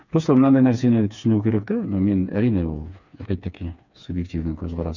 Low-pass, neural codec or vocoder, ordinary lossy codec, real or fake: 7.2 kHz; codec, 16 kHz, 4 kbps, FreqCodec, smaller model; none; fake